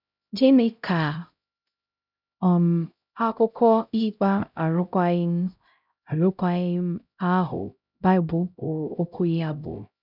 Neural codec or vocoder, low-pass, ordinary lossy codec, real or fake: codec, 16 kHz, 0.5 kbps, X-Codec, HuBERT features, trained on LibriSpeech; 5.4 kHz; none; fake